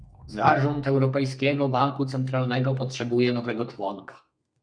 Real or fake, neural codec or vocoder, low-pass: fake; codec, 32 kHz, 1.9 kbps, SNAC; 9.9 kHz